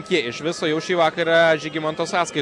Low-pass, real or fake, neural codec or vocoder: 10.8 kHz; real; none